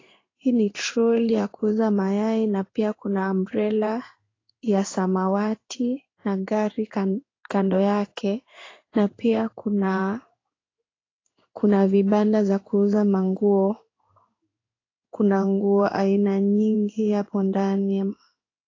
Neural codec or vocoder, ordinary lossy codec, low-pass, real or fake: codec, 16 kHz in and 24 kHz out, 1 kbps, XY-Tokenizer; AAC, 32 kbps; 7.2 kHz; fake